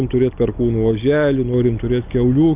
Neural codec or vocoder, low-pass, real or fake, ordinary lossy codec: none; 3.6 kHz; real; Opus, 24 kbps